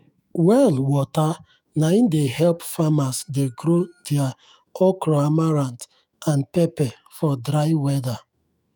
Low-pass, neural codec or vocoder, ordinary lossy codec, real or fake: none; autoencoder, 48 kHz, 128 numbers a frame, DAC-VAE, trained on Japanese speech; none; fake